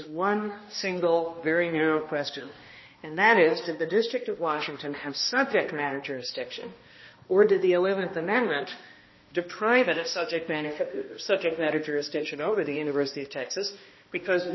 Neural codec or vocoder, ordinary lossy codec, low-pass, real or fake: codec, 16 kHz, 1 kbps, X-Codec, HuBERT features, trained on balanced general audio; MP3, 24 kbps; 7.2 kHz; fake